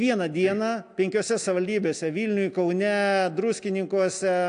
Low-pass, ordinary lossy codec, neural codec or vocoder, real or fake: 9.9 kHz; AAC, 64 kbps; none; real